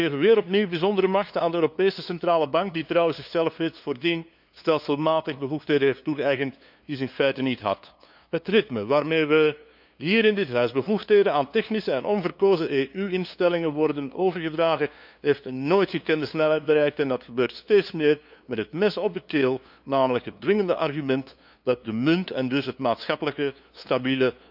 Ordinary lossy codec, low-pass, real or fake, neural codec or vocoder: none; 5.4 kHz; fake; codec, 16 kHz, 2 kbps, FunCodec, trained on LibriTTS, 25 frames a second